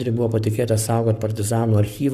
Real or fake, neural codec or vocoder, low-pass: fake; codec, 44.1 kHz, 7.8 kbps, Pupu-Codec; 14.4 kHz